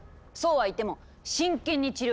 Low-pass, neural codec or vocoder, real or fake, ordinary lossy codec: none; none; real; none